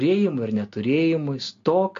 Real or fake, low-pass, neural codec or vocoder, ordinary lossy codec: real; 7.2 kHz; none; AAC, 48 kbps